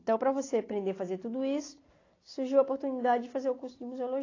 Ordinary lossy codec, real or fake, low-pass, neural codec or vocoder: AAC, 32 kbps; real; 7.2 kHz; none